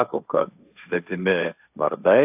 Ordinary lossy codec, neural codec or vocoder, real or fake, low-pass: AAC, 32 kbps; codec, 16 kHz, 1.1 kbps, Voila-Tokenizer; fake; 3.6 kHz